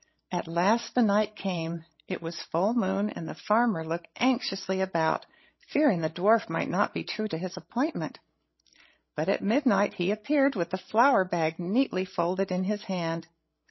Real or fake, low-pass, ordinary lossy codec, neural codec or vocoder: fake; 7.2 kHz; MP3, 24 kbps; codec, 16 kHz, 16 kbps, FreqCodec, larger model